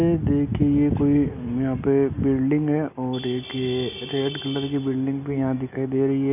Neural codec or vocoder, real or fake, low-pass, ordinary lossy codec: none; real; 3.6 kHz; none